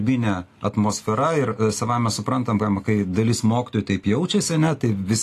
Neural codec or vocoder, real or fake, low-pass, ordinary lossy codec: none; real; 14.4 kHz; AAC, 48 kbps